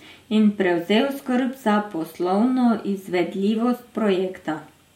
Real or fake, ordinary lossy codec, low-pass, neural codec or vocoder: real; MP3, 64 kbps; 19.8 kHz; none